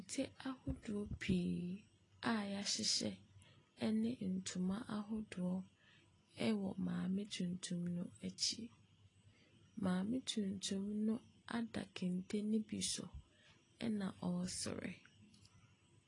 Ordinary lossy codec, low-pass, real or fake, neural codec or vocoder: AAC, 32 kbps; 10.8 kHz; real; none